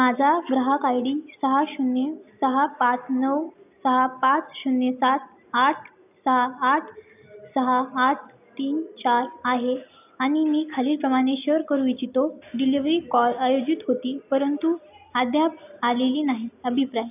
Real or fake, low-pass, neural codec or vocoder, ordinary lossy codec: real; 3.6 kHz; none; none